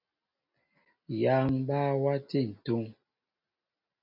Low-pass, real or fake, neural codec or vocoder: 5.4 kHz; real; none